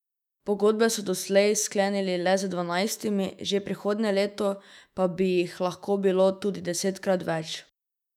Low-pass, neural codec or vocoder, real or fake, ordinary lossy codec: 19.8 kHz; autoencoder, 48 kHz, 128 numbers a frame, DAC-VAE, trained on Japanese speech; fake; none